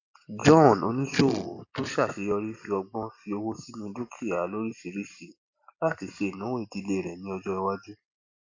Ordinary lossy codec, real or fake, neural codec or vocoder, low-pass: AAC, 48 kbps; real; none; 7.2 kHz